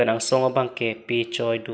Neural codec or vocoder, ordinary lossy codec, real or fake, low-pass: none; none; real; none